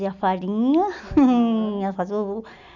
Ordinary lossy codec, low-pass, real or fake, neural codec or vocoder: none; 7.2 kHz; real; none